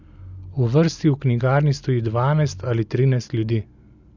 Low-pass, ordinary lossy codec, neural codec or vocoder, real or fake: 7.2 kHz; none; none; real